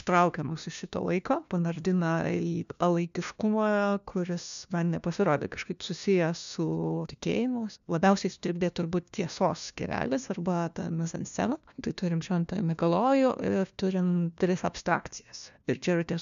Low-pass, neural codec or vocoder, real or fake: 7.2 kHz; codec, 16 kHz, 1 kbps, FunCodec, trained on LibriTTS, 50 frames a second; fake